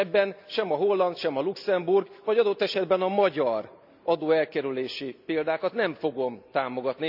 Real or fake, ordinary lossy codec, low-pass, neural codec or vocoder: real; none; 5.4 kHz; none